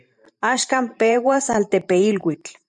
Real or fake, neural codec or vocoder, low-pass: fake; vocoder, 44.1 kHz, 128 mel bands every 512 samples, BigVGAN v2; 10.8 kHz